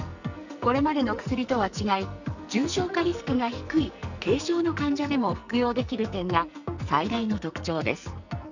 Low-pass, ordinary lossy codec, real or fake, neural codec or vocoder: 7.2 kHz; none; fake; codec, 44.1 kHz, 2.6 kbps, SNAC